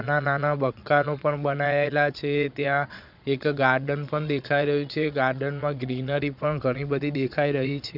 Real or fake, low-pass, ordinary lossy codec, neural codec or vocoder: fake; 5.4 kHz; none; vocoder, 22.05 kHz, 80 mel bands, Vocos